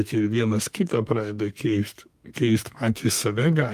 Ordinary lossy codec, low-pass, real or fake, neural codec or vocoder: Opus, 32 kbps; 14.4 kHz; fake; codec, 32 kHz, 1.9 kbps, SNAC